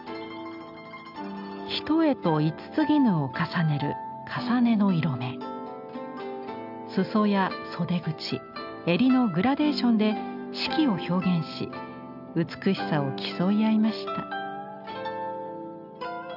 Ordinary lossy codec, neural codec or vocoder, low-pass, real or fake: none; none; 5.4 kHz; real